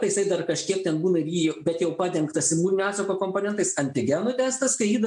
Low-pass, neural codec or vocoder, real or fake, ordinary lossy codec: 10.8 kHz; none; real; MP3, 64 kbps